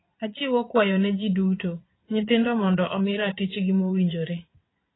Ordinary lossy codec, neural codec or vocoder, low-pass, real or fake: AAC, 16 kbps; vocoder, 22.05 kHz, 80 mel bands, Vocos; 7.2 kHz; fake